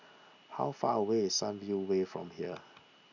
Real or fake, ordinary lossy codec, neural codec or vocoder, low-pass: real; none; none; 7.2 kHz